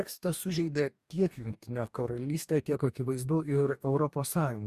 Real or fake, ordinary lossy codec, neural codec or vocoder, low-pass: fake; Opus, 64 kbps; codec, 44.1 kHz, 2.6 kbps, DAC; 14.4 kHz